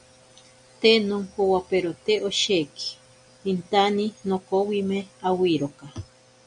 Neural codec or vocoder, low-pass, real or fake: none; 9.9 kHz; real